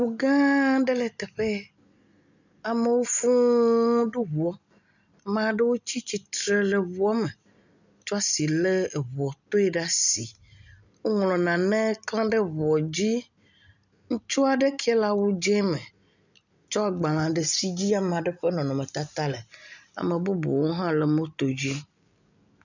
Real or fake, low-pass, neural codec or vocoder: real; 7.2 kHz; none